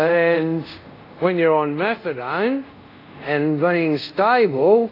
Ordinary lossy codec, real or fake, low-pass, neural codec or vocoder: AAC, 32 kbps; fake; 5.4 kHz; codec, 24 kHz, 0.5 kbps, DualCodec